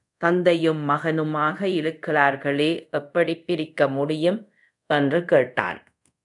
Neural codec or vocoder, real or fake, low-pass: codec, 24 kHz, 0.5 kbps, DualCodec; fake; 10.8 kHz